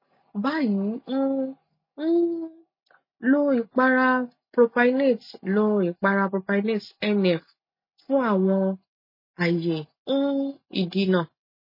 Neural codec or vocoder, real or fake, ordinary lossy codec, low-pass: none; real; MP3, 24 kbps; 5.4 kHz